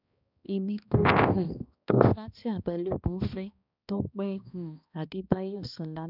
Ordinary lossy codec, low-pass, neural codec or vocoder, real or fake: none; 5.4 kHz; codec, 16 kHz, 1 kbps, X-Codec, HuBERT features, trained on balanced general audio; fake